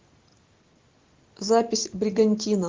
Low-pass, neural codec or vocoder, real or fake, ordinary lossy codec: 7.2 kHz; none; real; Opus, 16 kbps